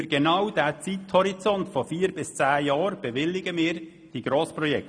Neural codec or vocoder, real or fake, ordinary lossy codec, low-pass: none; real; none; 9.9 kHz